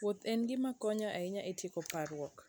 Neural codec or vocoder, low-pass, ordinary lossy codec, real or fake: none; none; none; real